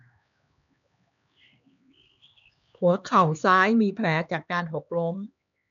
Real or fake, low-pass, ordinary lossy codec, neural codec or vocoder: fake; 7.2 kHz; none; codec, 16 kHz, 2 kbps, X-Codec, HuBERT features, trained on LibriSpeech